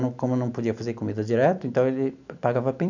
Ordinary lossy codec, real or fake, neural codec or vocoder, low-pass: none; real; none; 7.2 kHz